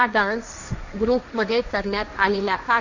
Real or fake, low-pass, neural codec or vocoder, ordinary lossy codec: fake; 7.2 kHz; codec, 16 kHz, 1.1 kbps, Voila-Tokenizer; none